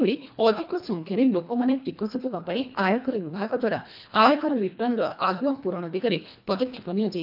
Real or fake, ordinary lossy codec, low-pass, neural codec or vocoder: fake; none; 5.4 kHz; codec, 24 kHz, 1.5 kbps, HILCodec